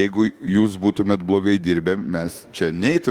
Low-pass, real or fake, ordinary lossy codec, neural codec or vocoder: 19.8 kHz; fake; Opus, 32 kbps; autoencoder, 48 kHz, 32 numbers a frame, DAC-VAE, trained on Japanese speech